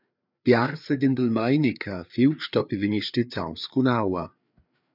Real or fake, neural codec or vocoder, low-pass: fake; codec, 16 kHz, 4 kbps, FreqCodec, larger model; 5.4 kHz